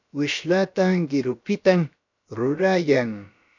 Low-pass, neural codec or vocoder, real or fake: 7.2 kHz; codec, 16 kHz, about 1 kbps, DyCAST, with the encoder's durations; fake